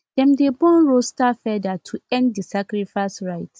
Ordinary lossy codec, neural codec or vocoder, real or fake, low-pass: none; none; real; none